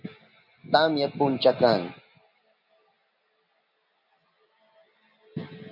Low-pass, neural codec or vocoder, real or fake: 5.4 kHz; none; real